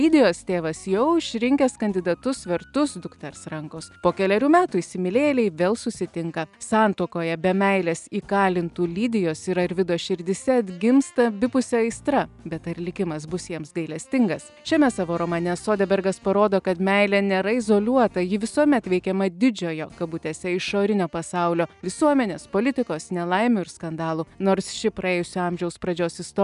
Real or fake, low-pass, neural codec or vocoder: real; 10.8 kHz; none